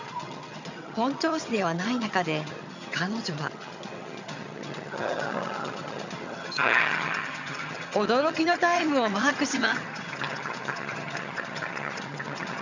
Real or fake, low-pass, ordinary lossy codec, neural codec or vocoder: fake; 7.2 kHz; none; vocoder, 22.05 kHz, 80 mel bands, HiFi-GAN